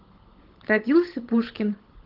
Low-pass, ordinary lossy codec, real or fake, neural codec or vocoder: 5.4 kHz; Opus, 16 kbps; fake; codec, 16 kHz, 16 kbps, FunCodec, trained on LibriTTS, 50 frames a second